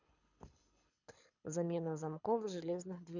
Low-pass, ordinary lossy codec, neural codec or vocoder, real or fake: 7.2 kHz; none; codec, 24 kHz, 6 kbps, HILCodec; fake